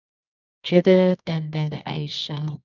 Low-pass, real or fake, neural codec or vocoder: 7.2 kHz; fake; codec, 24 kHz, 0.9 kbps, WavTokenizer, medium music audio release